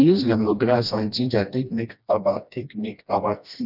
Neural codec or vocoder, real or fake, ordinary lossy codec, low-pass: codec, 16 kHz, 1 kbps, FreqCodec, smaller model; fake; none; 5.4 kHz